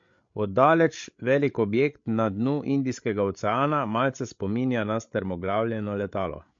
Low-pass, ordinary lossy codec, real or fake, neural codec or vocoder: 7.2 kHz; MP3, 48 kbps; fake; codec, 16 kHz, 8 kbps, FreqCodec, larger model